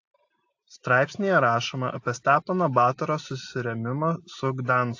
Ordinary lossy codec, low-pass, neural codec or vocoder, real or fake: AAC, 48 kbps; 7.2 kHz; none; real